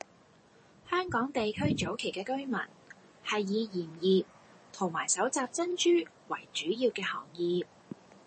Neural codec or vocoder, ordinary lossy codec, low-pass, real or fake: vocoder, 24 kHz, 100 mel bands, Vocos; MP3, 32 kbps; 10.8 kHz; fake